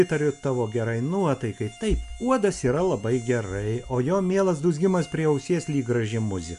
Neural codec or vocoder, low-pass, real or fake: none; 10.8 kHz; real